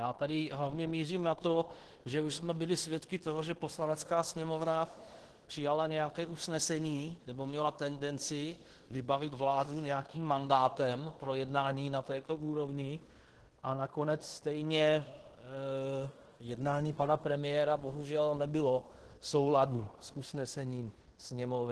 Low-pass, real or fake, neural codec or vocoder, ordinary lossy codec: 10.8 kHz; fake; codec, 16 kHz in and 24 kHz out, 0.9 kbps, LongCat-Audio-Codec, fine tuned four codebook decoder; Opus, 16 kbps